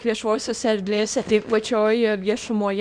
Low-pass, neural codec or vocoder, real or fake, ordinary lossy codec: 9.9 kHz; codec, 24 kHz, 0.9 kbps, WavTokenizer, small release; fake; Opus, 64 kbps